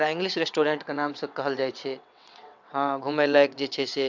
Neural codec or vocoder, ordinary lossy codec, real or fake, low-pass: vocoder, 22.05 kHz, 80 mel bands, WaveNeXt; none; fake; 7.2 kHz